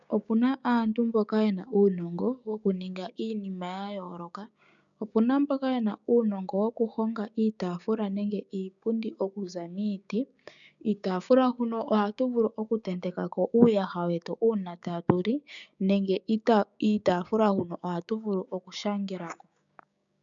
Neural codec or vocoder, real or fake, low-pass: codec, 16 kHz, 6 kbps, DAC; fake; 7.2 kHz